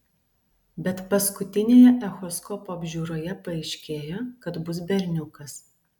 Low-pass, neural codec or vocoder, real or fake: 19.8 kHz; none; real